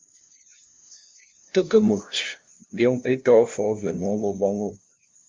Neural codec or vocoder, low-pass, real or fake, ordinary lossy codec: codec, 16 kHz, 0.5 kbps, FunCodec, trained on LibriTTS, 25 frames a second; 7.2 kHz; fake; Opus, 32 kbps